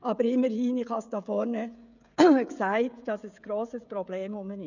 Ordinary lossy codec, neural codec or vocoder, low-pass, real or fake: none; codec, 16 kHz, 16 kbps, FreqCodec, smaller model; 7.2 kHz; fake